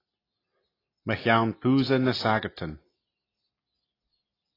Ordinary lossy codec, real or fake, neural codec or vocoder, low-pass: AAC, 24 kbps; real; none; 5.4 kHz